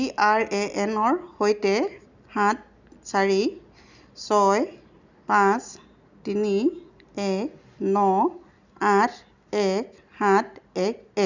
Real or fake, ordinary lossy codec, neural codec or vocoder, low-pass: real; none; none; 7.2 kHz